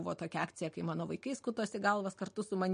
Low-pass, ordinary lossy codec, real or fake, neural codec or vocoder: 9.9 kHz; MP3, 48 kbps; real; none